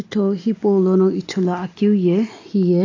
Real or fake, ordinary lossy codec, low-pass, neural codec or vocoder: real; AAC, 32 kbps; 7.2 kHz; none